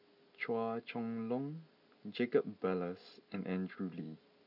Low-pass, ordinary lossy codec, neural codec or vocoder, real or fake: 5.4 kHz; none; none; real